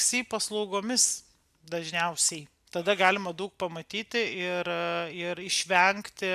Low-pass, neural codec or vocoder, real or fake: 14.4 kHz; none; real